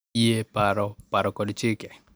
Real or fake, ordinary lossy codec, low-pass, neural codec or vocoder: real; none; none; none